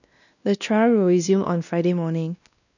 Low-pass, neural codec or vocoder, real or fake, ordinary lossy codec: 7.2 kHz; codec, 16 kHz, 1 kbps, X-Codec, WavLM features, trained on Multilingual LibriSpeech; fake; none